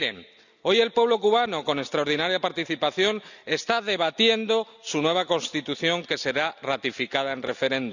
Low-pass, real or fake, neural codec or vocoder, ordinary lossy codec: 7.2 kHz; real; none; none